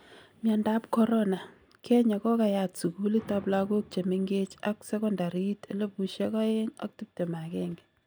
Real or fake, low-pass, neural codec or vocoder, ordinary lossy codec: real; none; none; none